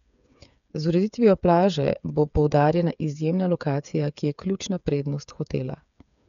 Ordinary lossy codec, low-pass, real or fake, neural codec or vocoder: none; 7.2 kHz; fake; codec, 16 kHz, 8 kbps, FreqCodec, smaller model